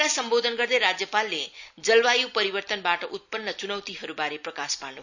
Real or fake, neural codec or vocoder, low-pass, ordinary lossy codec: real; none; 7.2 kHz; none